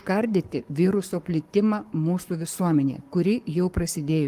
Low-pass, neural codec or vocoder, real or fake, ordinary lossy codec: 14.4 kHz; codec, 44.1 kHz, 7.8 kbps, Pupu-Codec; fake; Opus, 24 kbps